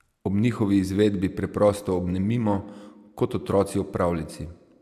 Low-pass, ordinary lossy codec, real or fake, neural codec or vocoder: 14.4 kHz; AAC, 96 kbps; real; none